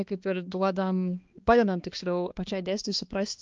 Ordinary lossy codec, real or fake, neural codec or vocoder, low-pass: Opus, 24 kbps; fake; codec, 16 kHz, 2 kbps, X-Codec, HuBERT features, trained on balanced general audio; 7.2 kHz